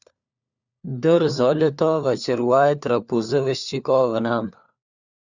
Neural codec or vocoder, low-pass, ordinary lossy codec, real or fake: codec, 16 kHz, 4 kbps, FunCodec, trained on LibriTTS, 50 frames a second; 7.2 kHz; Opus, 64 kbps; fake